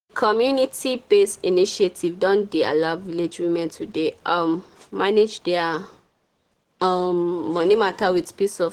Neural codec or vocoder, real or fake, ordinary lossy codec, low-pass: codec, 44.1 kHz, 7.8 kbps, DAC; fake; Opus, 16 kbps; 19.8 kHz